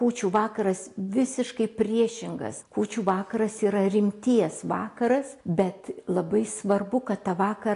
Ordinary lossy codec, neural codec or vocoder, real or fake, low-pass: AAC, 48 kbps; none; real; 10.8 kHz